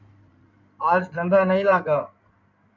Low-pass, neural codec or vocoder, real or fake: 7.2 kHz; codec, 16 kHz in and 24 kHz out, 2.2 kbps, FireRedTTS-2 codec; fake